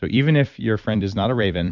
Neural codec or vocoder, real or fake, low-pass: vocoder, 44.1 kHz, 128 mel bands every 256 samples, BigVGAN v2; fake; 7.2 kHz